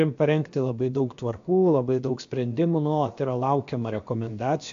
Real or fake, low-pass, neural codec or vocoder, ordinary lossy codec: fake; 7.2 kHz; codec, 16 kHz, about 1 kbps, DyCAST, with the encoder's durations; AAC, 64 kbps